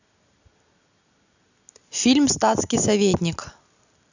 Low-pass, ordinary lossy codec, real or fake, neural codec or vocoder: 7.2 kHz; none; real; none